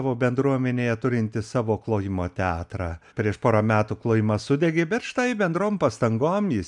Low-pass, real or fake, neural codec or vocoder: 10.8 kHz; real; none